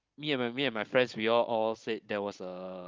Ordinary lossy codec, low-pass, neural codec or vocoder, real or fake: Opus, 32 kbps; 7.2 kHz; codec, 16 kHz, 16 kbps, FunCodec, trained on Chinese and English, 50 frames a second; fake